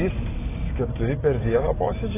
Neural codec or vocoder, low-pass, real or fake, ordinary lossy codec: none; 3.6 kHz; real; AAC, 16 kbps